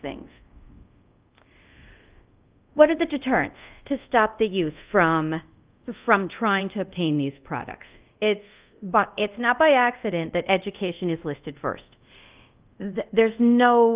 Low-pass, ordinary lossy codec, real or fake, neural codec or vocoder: 3.6 kHz; Opus, 32 kbps; fake; codec, 24 kHz, 0.5 kbps, DualCodec